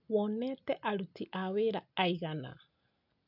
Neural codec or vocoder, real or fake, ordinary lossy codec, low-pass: none; real; none; 5.4 kHz